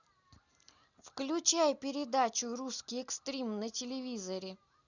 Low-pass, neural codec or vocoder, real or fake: 7.2 kHz; none; real